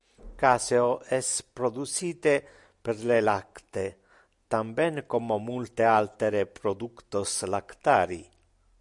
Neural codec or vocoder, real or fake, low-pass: none; real; 10.8 kHz